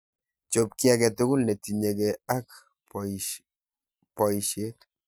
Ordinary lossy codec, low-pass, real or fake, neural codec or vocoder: none; none; real; none